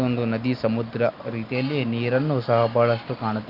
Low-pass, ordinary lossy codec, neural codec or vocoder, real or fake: 5.4 kHz; Opus, 24 kbps; none; real